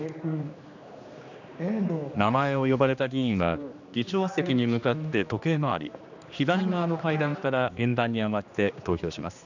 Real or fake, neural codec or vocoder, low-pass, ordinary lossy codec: fake; codec, 16 kHz, 2 kbps, X-Codec, HuBERT features, trained on general audio; 7.2 kHz; none